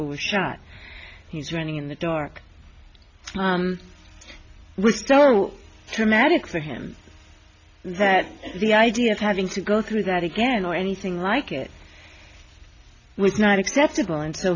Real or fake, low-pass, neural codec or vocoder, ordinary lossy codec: real; 7.2 kHz; none; MP3, 64 kbps